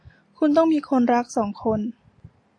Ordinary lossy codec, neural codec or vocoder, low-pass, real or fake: AAC, 48 kbps; none; 9.9 kHz; real